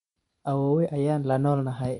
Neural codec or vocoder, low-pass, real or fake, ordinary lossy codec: vocoder, 44.1 kHz, 128 mel bands every 512 samples, BigVGAN v2; 19.8 kHz; fake; MP3, 48 kbps